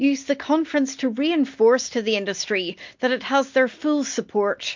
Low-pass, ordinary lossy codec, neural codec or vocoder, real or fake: 7.2 kHz; MP3, 48 kbps; none; real